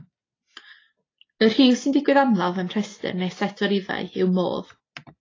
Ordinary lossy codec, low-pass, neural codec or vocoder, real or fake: AAC, 32 kbps; 7.2 kHz; none; real